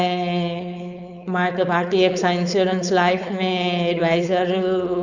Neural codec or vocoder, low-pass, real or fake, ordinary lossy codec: codec, 16 kHz, 4.8 kbps, FACodec; 7.2 kHz; fake; none